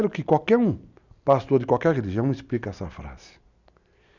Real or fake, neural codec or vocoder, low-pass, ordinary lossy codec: real; none; 7.2 kHz; none